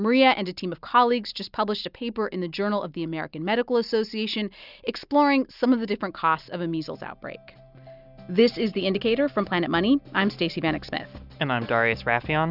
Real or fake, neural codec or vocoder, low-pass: real; none; 5.4 kHz